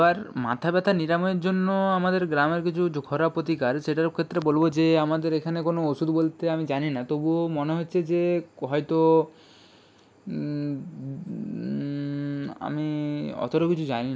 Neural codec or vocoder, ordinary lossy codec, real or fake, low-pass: none; none; real; none